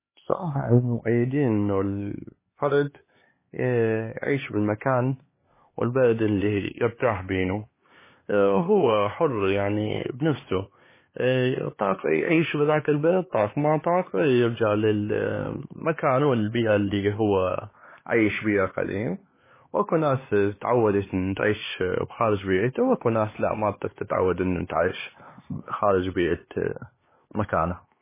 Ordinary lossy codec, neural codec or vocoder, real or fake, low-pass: MP3, 16 kbps; codec, 16 kHz, 4 kbps, X-Codec, HuBERT features, trained on LibriSpeech; fake; 3.6 kHz